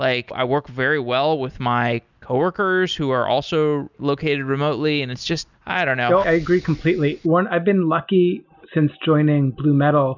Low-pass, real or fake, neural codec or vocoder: 7.2 kHz; real; none